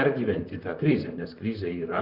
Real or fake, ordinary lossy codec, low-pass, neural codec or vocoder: fake; Opus, 64 kbps; 5.4 kHz; vocoder, 44.1 kHz, 128 mel bands, Pupu-Vocoder